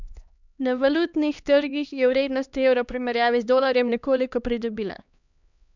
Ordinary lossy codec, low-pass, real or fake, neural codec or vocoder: none; 7.2 kHz; fake; codec, 16 kHz, 2 kbps, X-Codec, HuBERT features, trained on LibriSpeech